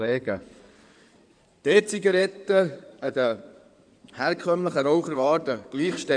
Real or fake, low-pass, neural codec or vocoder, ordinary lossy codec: fake; 9.9 kHz; codec, 16 kHz in and 24 kHz out, 2.2 kbps, FireRedTTS-2 codec; none